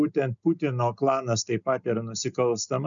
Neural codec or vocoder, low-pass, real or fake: none; 7.2 kHz; real